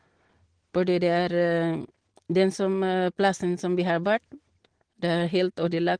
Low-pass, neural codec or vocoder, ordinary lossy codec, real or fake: 9.9 kHz; none; Opus, 16 kbps; real